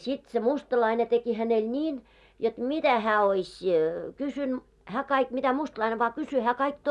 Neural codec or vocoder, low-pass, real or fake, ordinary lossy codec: none; none; real; none